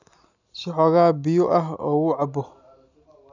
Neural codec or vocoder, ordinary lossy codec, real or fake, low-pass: none; none; real; 7.2 kHz